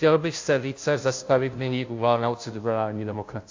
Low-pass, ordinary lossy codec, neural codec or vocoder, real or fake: 7.2 kHz; AAC, 48 kbps; codec, 16 kHz, 0.5 kbps, FunCodec, trained on Chinese and English, 25 frames a second; fake